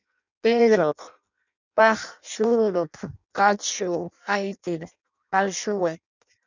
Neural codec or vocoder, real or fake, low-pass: codec, 16 kHz in and 24 kHz out, 0.6 kbps, FireRedTTS-2 codec; fake; 7.2 kHz